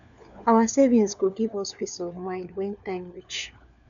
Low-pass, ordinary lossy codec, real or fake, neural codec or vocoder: 7.2 kHz; none; fake; codec, 16 kHz, 4 kbps, FunCodec, trained on LibriTTS, 50 frames a second